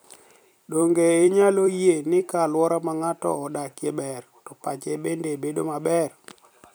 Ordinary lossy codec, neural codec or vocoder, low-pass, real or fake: none; vocoder, 44.1 kHz, 128 mel bands every 256 samples, BigVGAN v2; none; fake